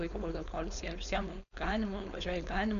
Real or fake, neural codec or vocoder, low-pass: fake; codec, 16 kHz, 4.8 kbps, FACodec; 7.2 kHz